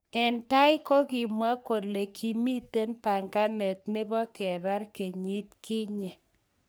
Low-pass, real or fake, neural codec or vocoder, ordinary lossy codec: none; fake; codec, 44.1 kHz, 3.4 kbps, Pupu-Codec; none